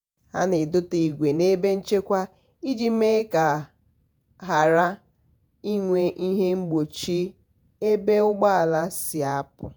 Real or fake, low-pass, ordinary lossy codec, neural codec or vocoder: fake; none; none; vocoder, 48 kHz, 128 mel bands, Vocos